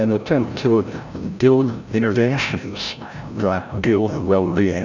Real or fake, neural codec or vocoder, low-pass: fake; codec, 16 kHz, 0.5 kbps, FreqCodec, larger model; 7.2 kHz